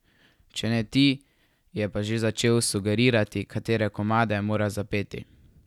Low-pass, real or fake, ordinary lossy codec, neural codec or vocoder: 19.8 kHz; real; none; none